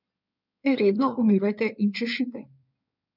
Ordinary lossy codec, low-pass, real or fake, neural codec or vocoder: none; 5.4 kHz; fake; codec, 16 kHz in and 24 kHz out, 2.2 kbps, FireRedTTS-2 codec